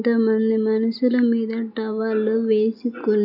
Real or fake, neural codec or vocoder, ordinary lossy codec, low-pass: real; none; none; 5.4 kHz